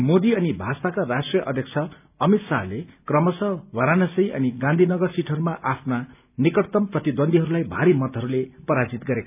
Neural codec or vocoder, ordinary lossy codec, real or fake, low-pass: none; none; real; 3.6 kHz